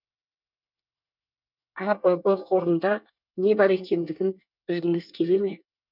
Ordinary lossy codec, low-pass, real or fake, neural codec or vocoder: none; 5.4 kHz; fake; codec, 24 kHz, 1 kbps, SNAC